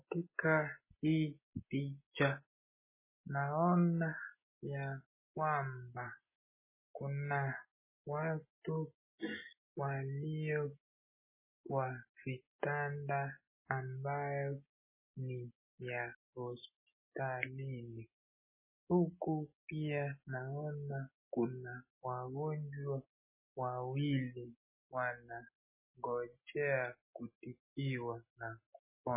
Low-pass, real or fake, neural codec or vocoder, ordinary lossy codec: 3.6 kHz; real; none; MP3, 16 kbps